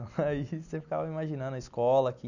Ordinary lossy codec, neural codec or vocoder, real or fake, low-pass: none; none; real; 7.2 kHz